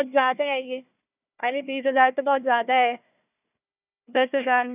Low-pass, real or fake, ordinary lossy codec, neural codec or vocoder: 3.6 kHz; fake; none; codec, 16 kHz, 1 kbps, FunCodec, trained on Chinese and English, 50 frames a second